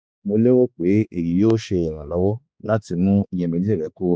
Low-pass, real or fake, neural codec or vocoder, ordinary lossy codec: none; fake; codec, 16 kHz, 2 kbps, X-Codec, HuBERT features, trained on balanced general audio; none